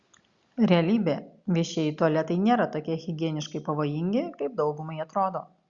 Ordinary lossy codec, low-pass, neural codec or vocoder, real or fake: Opus, 64 kbps; 7.2 kHz; none; real